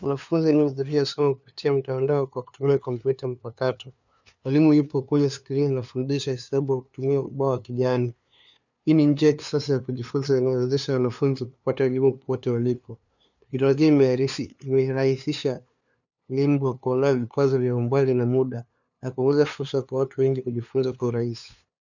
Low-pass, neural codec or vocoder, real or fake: 7.2 kHz; codec, 16 kHz, 2 kbps, FunCodec, trained on LibriTTS, 25 frames a second; fake